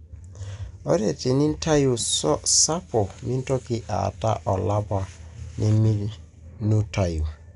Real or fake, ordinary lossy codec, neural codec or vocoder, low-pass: real; none; none; 10.8 kHz